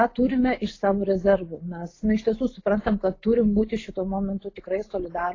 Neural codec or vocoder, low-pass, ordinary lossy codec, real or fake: none; 7.2 kHz; AAC, 32 kbps; real